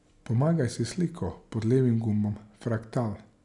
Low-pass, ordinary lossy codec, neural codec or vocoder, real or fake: 10.8 kHz; none; none; real